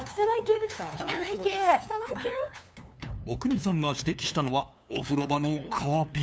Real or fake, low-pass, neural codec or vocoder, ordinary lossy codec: fake; none; codec, 16 kHz, 2 kbps, FunCodec, trained on LibriTTS, 25 frames a second; none